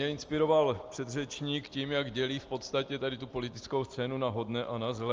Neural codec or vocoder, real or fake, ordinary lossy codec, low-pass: none; real; Opus, 24 kbps; 7.2 kHz